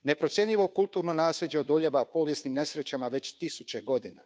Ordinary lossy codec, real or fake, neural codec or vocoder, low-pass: none; fake; codec, 16 kHz, 2 kbps, FunCodec, trained on Chinese and English, 25 frames a second; none